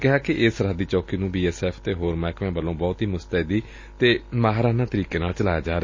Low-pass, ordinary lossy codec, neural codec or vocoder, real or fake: 7.2 kHz; MP3, 32 kbps; none; real